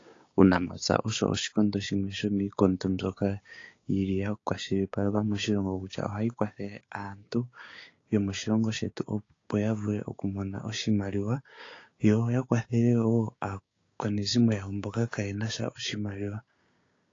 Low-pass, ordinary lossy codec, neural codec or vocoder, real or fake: 7.2 kHz; AAC, 32 kbps; codec, 16 kHz, 6 kbps, DAC; fake